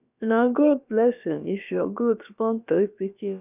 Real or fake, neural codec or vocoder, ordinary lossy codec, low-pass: fake; codec, 16 kHz, about 1 kbps, DyCAST, with the encoder's durations; none; 3.6 kHz